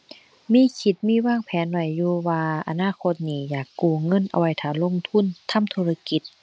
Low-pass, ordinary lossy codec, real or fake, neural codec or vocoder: none; none; real; none